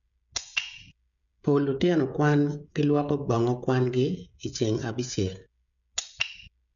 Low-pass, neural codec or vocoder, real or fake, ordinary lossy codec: 7.2 kHz; codec, 16 kHz, 16 kbps, FreqCodec, smaller model; fake; none